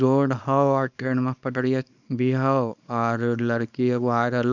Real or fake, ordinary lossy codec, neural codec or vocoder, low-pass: fake; none; codec, 24 kHz, 0.9 kbps, WavTokenizer, small release; 7.2 kHz